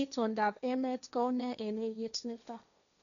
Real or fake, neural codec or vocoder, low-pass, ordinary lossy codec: fake; codec, 16 kHz, 1.1 kbps, Voila-Tokenizer; 7.2 kHz; none